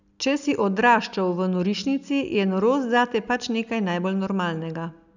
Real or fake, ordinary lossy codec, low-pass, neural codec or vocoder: real; none; 7.2 kHz; none